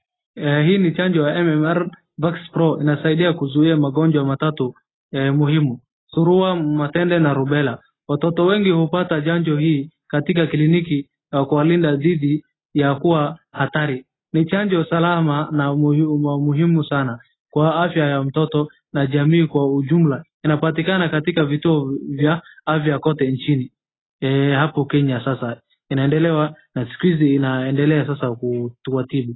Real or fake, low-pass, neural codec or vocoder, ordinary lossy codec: real; 7.2 kHz; none; AAC, 16 kbps